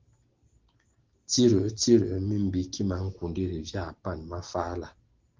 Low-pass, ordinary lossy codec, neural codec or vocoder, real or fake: 7.2 kHz; Opus, 16 kbps; none; real